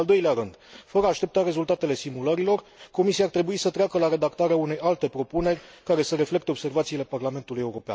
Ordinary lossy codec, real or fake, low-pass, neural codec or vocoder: none; real; none; none